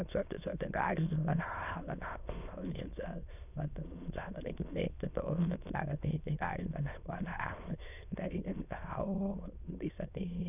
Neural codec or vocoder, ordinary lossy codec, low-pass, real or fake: autoencoder, 22.05 kHz, a latent of 192 numbers a frame, VITS, trained on many speakers; none; 3.6 kHz; fake